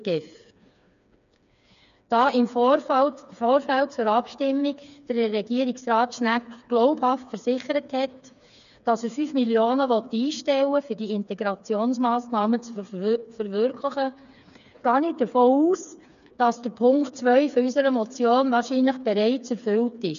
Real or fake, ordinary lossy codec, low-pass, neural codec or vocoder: fake; none; 7.2 kHz; codec, 16 kHz, 4 kbps, FreqCodec, smaller model